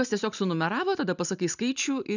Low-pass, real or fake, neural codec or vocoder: 7.2 kHz; real; none